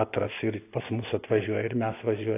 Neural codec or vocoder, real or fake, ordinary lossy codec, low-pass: none; real; AAC, 24 kbps; 3.6 kHz